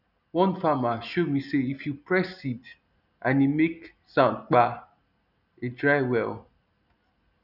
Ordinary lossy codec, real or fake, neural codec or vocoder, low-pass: none; real; none; 5.4 kHz